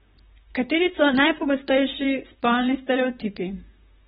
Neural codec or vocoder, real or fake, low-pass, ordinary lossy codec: vocoder, 44.1 kHz, 128 mel bands, Pupu-Vocoder; fake; 19.8 kHz; AAC, 16 kbps